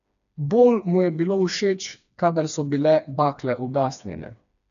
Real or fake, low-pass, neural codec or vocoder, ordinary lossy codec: fake; 7.2 kHz; codec, 16 kHz, 2 kbps, FreqCodec, smaller model; none